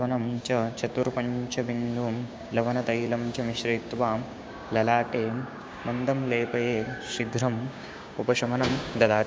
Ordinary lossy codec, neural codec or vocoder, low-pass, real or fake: none; codec, 16 kHz, 6 kbps, DAC; none; fake